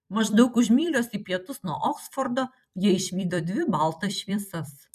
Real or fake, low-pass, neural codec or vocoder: fake; 14.4 kHz; vocoder, 44.1 kHz, 128 mel bands every 256 samples, BigVGAN v2